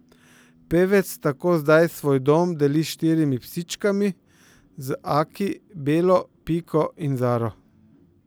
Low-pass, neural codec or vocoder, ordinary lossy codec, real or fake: none; none; none; real